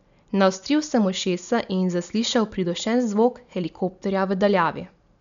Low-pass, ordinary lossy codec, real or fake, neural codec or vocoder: 7.2 kHz; none; real; none